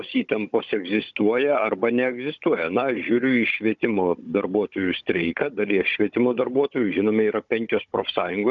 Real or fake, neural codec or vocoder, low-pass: fake; codec, 16 kHz, 16 kbps, FunCodec, trained on Chinese and English, 50 frames a second; 7.2 kHz